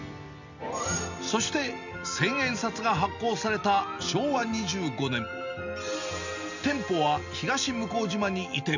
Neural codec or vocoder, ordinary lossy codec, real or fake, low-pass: none; none; real; 7.2 kHz